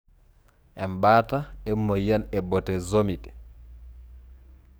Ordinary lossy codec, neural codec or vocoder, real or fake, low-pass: none; codec, 44.1 kHz, 7.8 kbps, DAC; fake; none